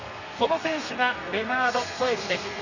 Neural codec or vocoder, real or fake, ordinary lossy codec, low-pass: codec, 32 kHz, 1.9 kbps, SNAC; fake; none; 7.2 kHz